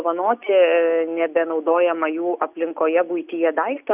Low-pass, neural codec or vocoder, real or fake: 3.6 kHz; none; real